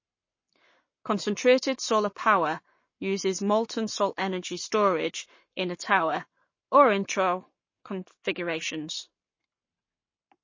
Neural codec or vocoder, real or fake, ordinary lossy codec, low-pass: codec, 44.1 kHz, 7.8 kbps, Pupu-Codec; fake; MP3, 32 kbps; 7.2 kHz